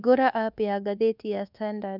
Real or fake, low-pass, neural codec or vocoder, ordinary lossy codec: fake; 5.4 kHz; codec, 24 kHz, 1.2 kbps, DualCodec; none